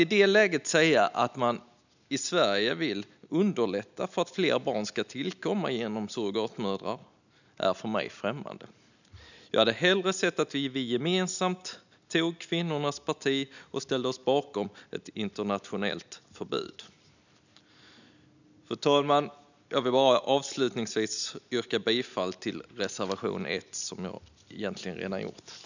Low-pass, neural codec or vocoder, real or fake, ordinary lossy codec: 7.2 kHz; none; real; none